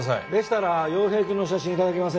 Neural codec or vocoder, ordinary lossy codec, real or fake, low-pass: none; none; real; none